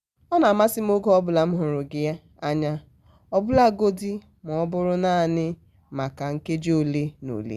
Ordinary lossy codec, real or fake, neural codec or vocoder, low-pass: Opus, 64 kbps; real; none; 14.4 kHz